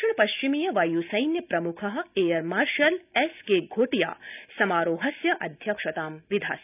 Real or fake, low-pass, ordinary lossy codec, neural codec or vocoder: real; 3.6 kHz; none; none